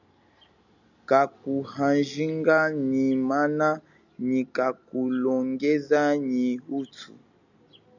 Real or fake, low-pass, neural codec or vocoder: real; 7.2 kHz; none